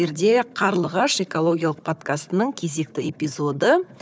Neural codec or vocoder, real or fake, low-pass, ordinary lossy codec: codec, 16 kHz, 4 kbps, FunCodec, trained on Chinese and English, 50 frames a second; fake; none; none